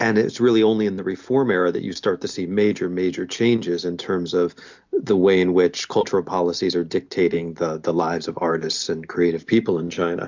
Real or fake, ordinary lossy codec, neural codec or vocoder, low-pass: real; MP3, 64 kbps; none; 7.2 kHz